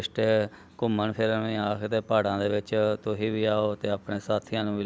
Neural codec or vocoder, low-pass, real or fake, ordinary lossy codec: none; none; real; none